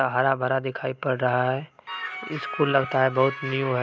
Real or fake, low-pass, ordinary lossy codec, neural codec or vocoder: real; none; none; none